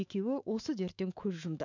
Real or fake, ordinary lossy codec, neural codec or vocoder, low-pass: fake; none; autoencoder, 48 kHz, 128 numbers a frame, DAC-VAE, trained on Japanese speech; 7.2 kHz